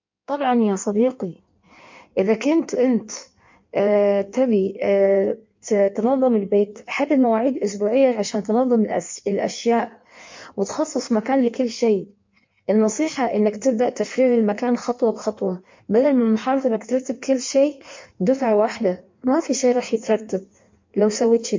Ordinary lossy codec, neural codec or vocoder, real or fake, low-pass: MP3, 48 kbps; codec, 16 kHz in and 24 kHz out, 1.1 kbps, FireRedTTS-2 codec; fake; 7.2 kHz